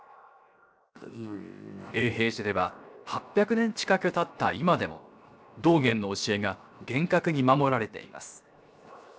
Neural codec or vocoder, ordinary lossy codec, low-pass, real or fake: codec, 16 kHz, 0.7 kbps, FocalCodec; none; none; fake